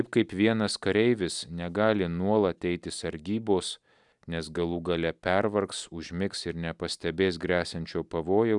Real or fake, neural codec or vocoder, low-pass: real; none; 10.8 kHz